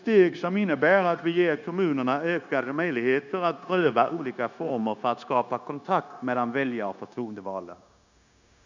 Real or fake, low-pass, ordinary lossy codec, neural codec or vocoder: fake; 7.2 kHz; none; codec, 16 kHz, 0.9 kbps, LongCat-Audio-Codec